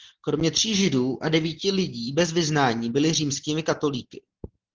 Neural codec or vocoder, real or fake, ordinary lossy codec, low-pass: none; real; Opus, 16 kbps; 7.2 kHz